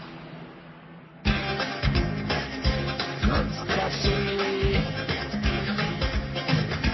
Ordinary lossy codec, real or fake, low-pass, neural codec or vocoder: MP3, 24 kbps; fake; 7.2 kHz; codec, 44.1 kHz, 3.4 kbps, Pupu-Codec